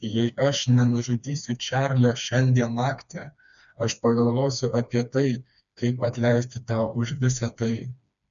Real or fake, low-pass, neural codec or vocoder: fake; 7.2 kHz; codec, 16 kHz, 2 kbps, FreqCodec, smaller model